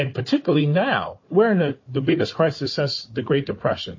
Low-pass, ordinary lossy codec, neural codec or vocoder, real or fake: 7.2 kHz; MP3, 32 kbps; codec, 16 kHz, 4 kbps, FunCodec, trained on Chinese and English, 50 frames a second; fake